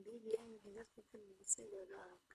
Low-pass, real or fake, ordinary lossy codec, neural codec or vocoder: none; fake; none; codec, 24 kHz, 3 kbps, HILCodec